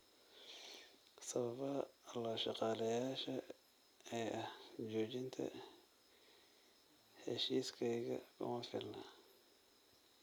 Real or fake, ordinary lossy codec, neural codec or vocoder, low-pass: real; none; none; none